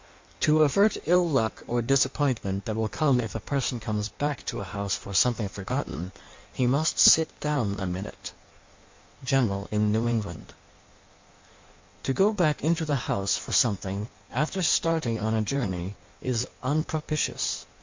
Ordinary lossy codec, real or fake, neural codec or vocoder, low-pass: MP3, 48 kbps; fake; codec, 16 kHz in and 24 kHz out, 1.1 kbps, FireRedTTS-2 codec; 7.2 kHz